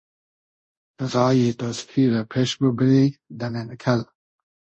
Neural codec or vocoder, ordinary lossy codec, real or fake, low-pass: codec, 24 kHz, 0.5 kbps, DualCodec; MP3, 32 kbps; fake; 10.8 kHz